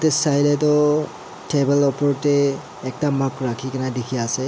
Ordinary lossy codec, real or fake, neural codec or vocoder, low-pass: none; real; none; none